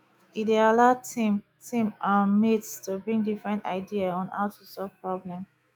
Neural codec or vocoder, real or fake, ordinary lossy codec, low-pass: autoencoder, 48 kHz, 128 numbers a frame, DAC-VAE, trained on Japanese speech; fake; none; none